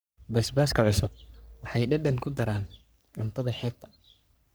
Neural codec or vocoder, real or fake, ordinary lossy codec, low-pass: codec, 44.1 kHz, 3.4 kbps, Pupu-Codec; fake; none; none